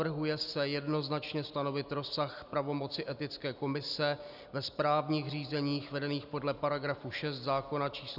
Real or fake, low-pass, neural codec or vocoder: real; 5.4 kHz; none